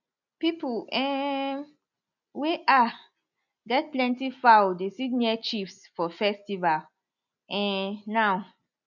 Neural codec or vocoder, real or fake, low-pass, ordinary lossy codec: none; real; 7.2 kHz; none